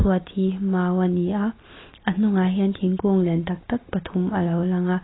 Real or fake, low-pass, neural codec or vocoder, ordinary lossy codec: real; 7.2 kHz; none; AAC, 16 kbps